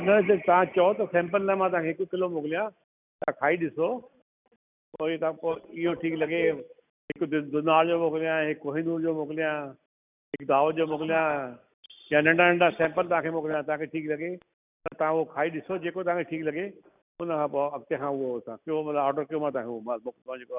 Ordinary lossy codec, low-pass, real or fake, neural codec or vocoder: none; 3.6 kHz; real; none